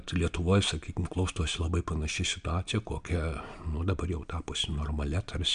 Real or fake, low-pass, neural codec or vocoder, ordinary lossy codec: real; 9.9 kHz; none; MP3, 64 kbps